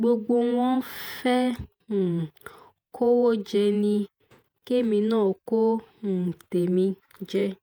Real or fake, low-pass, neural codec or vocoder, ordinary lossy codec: fake; none; vocoder, 48 kHz, 128 mel bands, Vocos; none